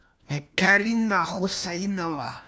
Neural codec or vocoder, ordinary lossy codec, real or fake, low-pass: codec, 16 kHz, 1 kbps, FunCodec, trained on LibriTTS, 50 frames a second; none; fake; none